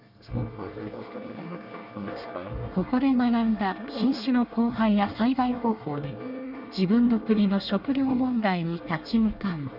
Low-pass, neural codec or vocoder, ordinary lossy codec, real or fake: 5.4 kHz; codec, 24 kHz, 1 kbps, SNAC; none; fake